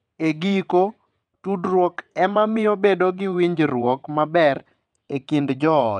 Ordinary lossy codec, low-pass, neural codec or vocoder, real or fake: none; 9.9 kHz; vocoder, 22.05 kHz, 80 mel bands, WaveNeXt; fake